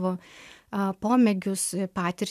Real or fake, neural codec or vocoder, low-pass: fake; vocoder, 44.1 kHz, 128 mel bands every 512 samples, BigVGAN v2; 14.4 kHz